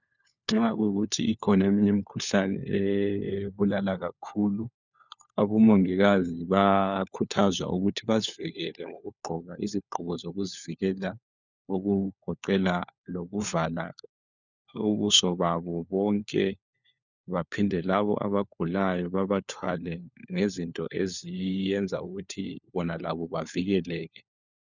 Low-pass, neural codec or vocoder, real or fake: 7.2 kHz; codec, 16 kHz, 4 kbps, FunCodec, trained on LibriTTS, 50 frames a second; fake